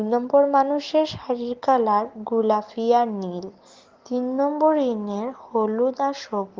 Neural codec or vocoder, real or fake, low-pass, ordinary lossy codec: none; real; 7.2 kHz; Opus, 16 kbps